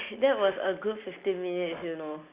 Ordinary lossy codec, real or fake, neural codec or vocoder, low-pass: Opus, 64 kbps; real; none; 3.6 kHz